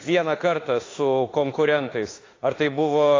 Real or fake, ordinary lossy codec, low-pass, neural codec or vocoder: fake; AAC, 32 kbps; 7.2 kHz; autoencoder, 48 kHz, 32 numbers a frame, DAC-VAE, trained on Japanese speech